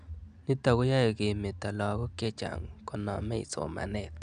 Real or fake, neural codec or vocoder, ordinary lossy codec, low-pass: real; none; none; 9.9 kHz